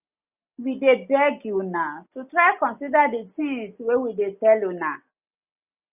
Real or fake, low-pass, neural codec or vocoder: real; 3.6 kHz; none